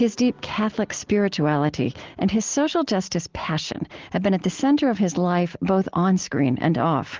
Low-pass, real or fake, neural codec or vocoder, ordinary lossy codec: 7.2 kHz; real; none; Opus, 16 kbps